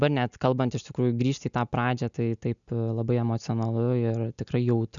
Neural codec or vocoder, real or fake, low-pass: none; real; 7.2 kHz